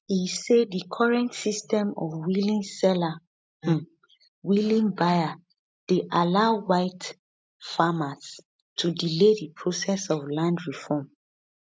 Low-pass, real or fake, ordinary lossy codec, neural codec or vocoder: none; real; none; none